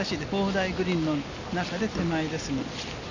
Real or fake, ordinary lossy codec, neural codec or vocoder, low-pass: real; none; none; 7.2 kHz